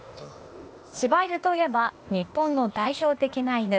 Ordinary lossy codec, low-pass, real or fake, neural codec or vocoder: none; none; fake; codec, 16 kHz, 0.8 kbps, ZipCodec